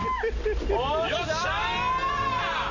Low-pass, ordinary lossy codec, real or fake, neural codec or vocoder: 7.2 kHz; none; real; none